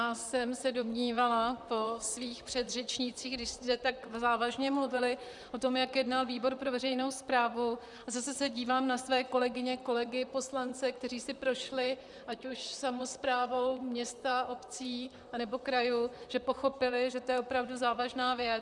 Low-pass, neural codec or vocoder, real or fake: 10.8 kHz; vocoder, 44.1 kHz, 128 mel bands, Pupu-Vocoder; fake